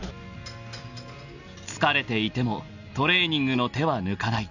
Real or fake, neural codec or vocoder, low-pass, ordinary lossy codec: real; none; 7.2 kHz; none